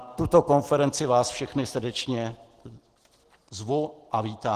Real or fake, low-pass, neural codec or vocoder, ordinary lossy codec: real; 14.4 kHz; none; Opus, 16 kbps